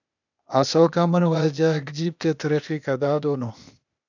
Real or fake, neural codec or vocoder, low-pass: fake; codec, 16 kHz, 0.8 kbps, ZipCodec; 7.2 kHz